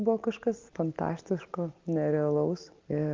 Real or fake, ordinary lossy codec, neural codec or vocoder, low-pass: real; Opus, 24 kbps; none; 7.2 kHz